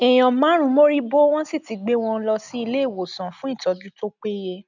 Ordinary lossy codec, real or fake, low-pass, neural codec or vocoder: none; real; 7.2 kHz; none